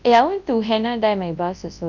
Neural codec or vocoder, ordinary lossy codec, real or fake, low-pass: codec, 24 kHz, 0.9 kbps, WavTokenizer, large speech release; none; fake; 7.2 kHz